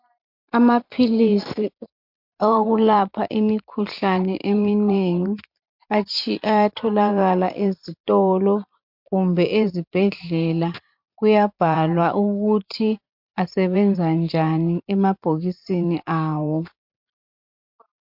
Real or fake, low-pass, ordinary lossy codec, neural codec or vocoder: fake; 5.4 kHz; MP3, 48 kbps; vocoder, 44.1 kHz, 128 mel bands every 512 samples, BigVGAN v2